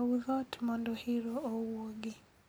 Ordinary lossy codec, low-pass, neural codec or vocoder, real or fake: none; none; none; real